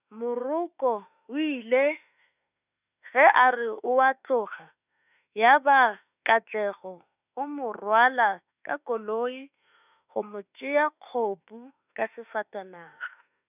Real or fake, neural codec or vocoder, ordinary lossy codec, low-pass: fake; autoencoder, 48 kHz, 32 numbers a frame, DAC-VAE, trained on Japanese speech; none; 3.6 kHz